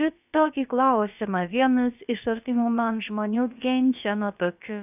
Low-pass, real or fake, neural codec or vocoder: 3.6 kHz; fake; codec, 16 kHz, about 1 kbps, DyCAST, with the encoder's durations